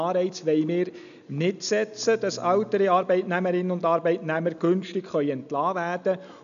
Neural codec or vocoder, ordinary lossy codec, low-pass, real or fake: none; none; 7.2 kHz; real